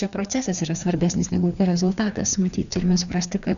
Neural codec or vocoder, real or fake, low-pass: codec, 16 kHz, 2 kbps, FreqCodec, larger model; fake; 7.2 kHz